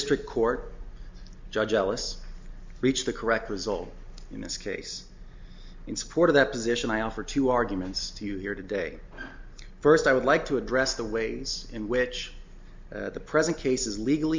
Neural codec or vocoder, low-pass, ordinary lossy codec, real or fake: none; 7.2 kHz; MP3, 64 kbps; real